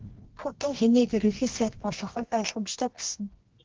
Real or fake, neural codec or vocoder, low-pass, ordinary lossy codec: fake; codec, 24 kHz, 0.9 kbps, WavTokenizer, medium music audio release; 7.2 kHz; Opus, 16 kbps